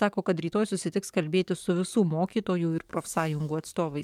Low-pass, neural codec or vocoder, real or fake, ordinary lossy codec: 19.8 kHz; codec, 44.1 kHz, 7.8 kbps, DAC; fake; MP3, 96 kbps